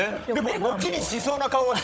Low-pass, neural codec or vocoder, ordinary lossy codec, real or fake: none; codec, 16 kHz, 16 kbps, FunCodec, trained on Chinese and English, 50 frames a second; none; fake